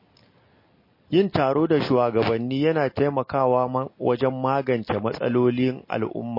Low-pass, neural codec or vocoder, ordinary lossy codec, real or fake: 5.4 kHz; none; MP3, 24 kbps; real